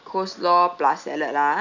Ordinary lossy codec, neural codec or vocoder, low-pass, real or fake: none; none; 7.2 kHz; real